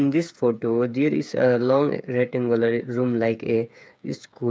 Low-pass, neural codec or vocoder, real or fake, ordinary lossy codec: none; codec, 16 kHz, 8 kbps, FreqCodec, smaller model; fake; none